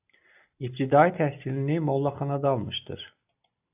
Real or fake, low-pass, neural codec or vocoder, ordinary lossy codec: real; 3.6 kHz; none; AAC, 32 kbps